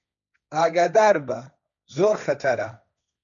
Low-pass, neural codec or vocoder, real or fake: 7.2 kHz; codec, 16 kHz, 1.1 kbps, Voila-Tokenizer; fake